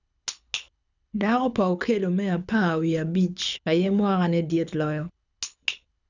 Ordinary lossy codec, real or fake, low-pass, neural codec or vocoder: none; fake; 7.2 kHz; codec, 24 kHz, 6 kbps, HILCodec